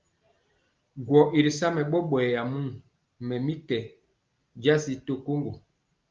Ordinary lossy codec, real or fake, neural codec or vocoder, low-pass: Opus, 24 kbps; real; none; 7.2 kHz